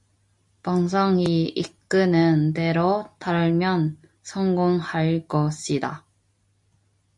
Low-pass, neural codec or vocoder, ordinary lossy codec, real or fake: 10.8 kHz; none; MP3, 48 kbps; real